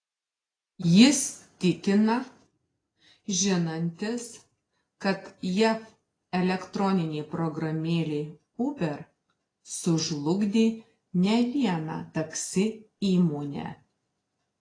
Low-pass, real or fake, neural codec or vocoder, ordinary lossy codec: 9.9 kHz; real; none; AAC, 32 kbps